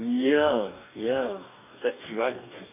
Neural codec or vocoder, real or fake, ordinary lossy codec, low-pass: codec, 44.1 kHz, 2.6 kbps, DAC; fake; none; 3.6 kHz